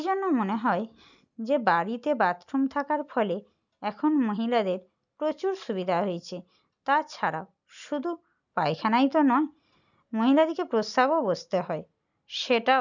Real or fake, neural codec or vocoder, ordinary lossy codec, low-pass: real; none; none; 7.2 kHz